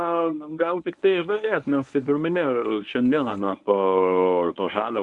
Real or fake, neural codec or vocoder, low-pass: fake; codec, 24 kHz, 0.9 kbps, WavTokenizer, medium speech release version 1; 10.8 kHz